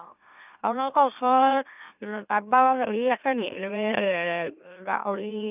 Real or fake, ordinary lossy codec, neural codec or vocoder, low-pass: fake; none; autoencoder, 44.1 kHz, a latent of 192 numbers a frame, MeloTTS; 3.6 kHz